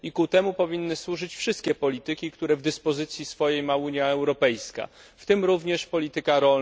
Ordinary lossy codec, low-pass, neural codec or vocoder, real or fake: none; none; none; real